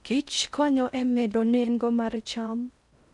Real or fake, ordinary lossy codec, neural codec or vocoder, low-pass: fake; none; codec, 16 kHz in and 24 kHz out, 0.6 kbps, FocalCodec, streaming, 4096 codes; 10.8 kHz